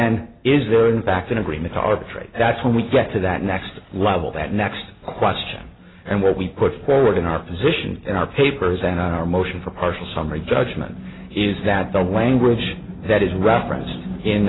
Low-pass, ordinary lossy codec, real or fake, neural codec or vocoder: 7.2 kHz; AAC, 16 kbps; real; none